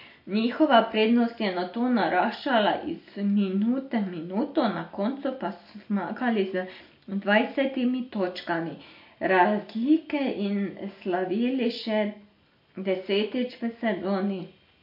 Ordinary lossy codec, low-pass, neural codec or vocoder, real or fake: MP3, 32 kbps; 5.4 kHz; none; real